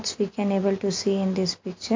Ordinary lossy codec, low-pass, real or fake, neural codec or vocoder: MP3, 48 kbps; 7.2 kHz; real; none